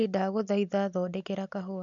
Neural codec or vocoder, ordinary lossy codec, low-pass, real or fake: none; none; 7.2 kHz; real